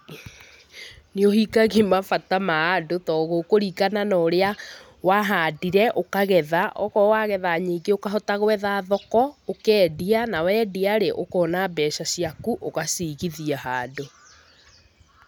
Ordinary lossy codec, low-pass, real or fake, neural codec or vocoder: none; none; real; none